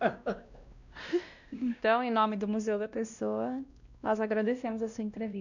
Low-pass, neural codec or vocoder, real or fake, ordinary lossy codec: 7.2 kHz; codec, 16 kHz, 1 kbps, X-Codec, WavLM features, trained on Multilingual LibriSpeech; fake; none